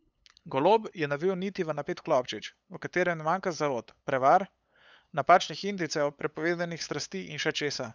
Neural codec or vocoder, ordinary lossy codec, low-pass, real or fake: codec, 16 kHz, 8 kbps, FunCodec, trained on LibriTTS, 25 frames a second; none; none; fake